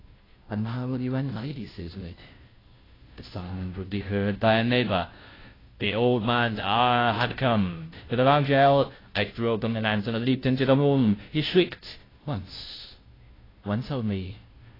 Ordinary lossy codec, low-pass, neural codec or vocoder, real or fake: AAC, 24 kbps; 5.4 kHz; codec, 16 kHz, 0.5 kbps, FunCodec, trained on Chinese and English, 25 frames a second; fake